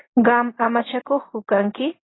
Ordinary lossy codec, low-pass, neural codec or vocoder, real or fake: AAC, 16 kbps; 7.2 kHz; none; real